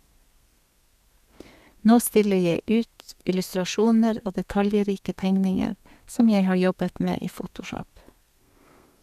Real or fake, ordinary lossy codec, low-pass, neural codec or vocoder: fake; none; 14.4 kHz; codec, 32 kHz, 1.9 kbps, SNAC